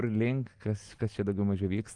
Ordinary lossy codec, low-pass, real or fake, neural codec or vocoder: Opus, 24 kbps; 10.8 kHz; real; none